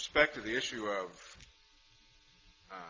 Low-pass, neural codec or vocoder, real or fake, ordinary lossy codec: 7.2 kHz; none; real; Opus, 24 kbps